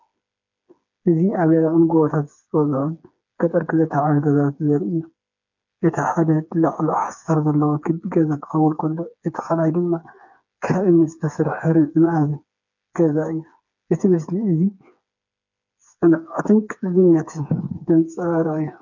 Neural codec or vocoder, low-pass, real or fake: codec, 16 kHz, 4 kbps, FreqCodec, smaller model; 7.2 kHz; fake